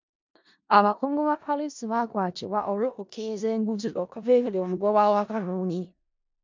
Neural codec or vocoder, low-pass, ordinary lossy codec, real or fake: codec, 16 kHz in and 24 kHz out, 0.4 kbps, LongCat-Audio-Codec, four codebook decoder; 7.2 kHz; MP3, 64 kbps; fake